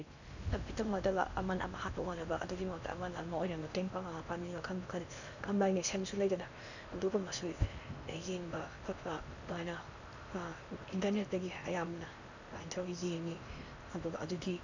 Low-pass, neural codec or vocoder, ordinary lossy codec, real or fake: 7.2 kHz; codec, 16 kHz in and 24 kHz out, 0.8 kbps, FocalCodec, streaming, 65536 codes; none; fake